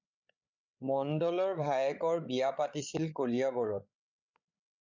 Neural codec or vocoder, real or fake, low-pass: codec, 16 kHz, 8 kbps, FreqCodec, larger model; fake; 7.2 kHz